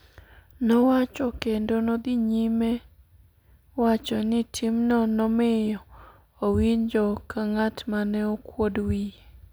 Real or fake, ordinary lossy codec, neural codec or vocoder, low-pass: real; none; none; none